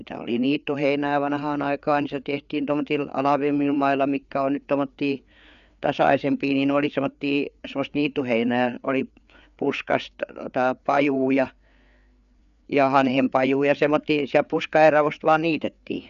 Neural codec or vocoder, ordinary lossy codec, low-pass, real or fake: codec, 16 kHz, 4 kbps, FreqCodec, larger model; none; 7.2 kHz; fake